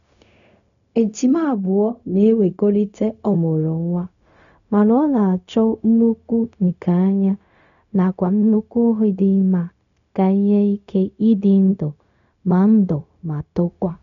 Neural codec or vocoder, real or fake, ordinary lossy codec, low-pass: codec, 16 kHz, 0.4 kbps, LongCat-Audio-Codec; fake; none; 7.2 kHz